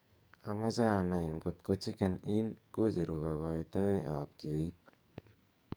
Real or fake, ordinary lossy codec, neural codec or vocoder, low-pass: fake; none; codec, 44.1 kHz, 2.6 kbps, SNAC; none